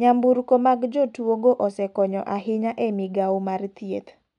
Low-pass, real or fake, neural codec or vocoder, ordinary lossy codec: 10.8 kHz; real; none; none